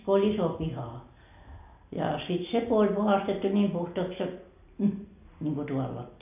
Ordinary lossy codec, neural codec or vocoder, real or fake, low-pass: AAC, 32 kbps; none; real; 3.6 kHz